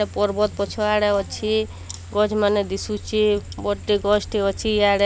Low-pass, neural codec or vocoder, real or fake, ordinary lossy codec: none; none; real; none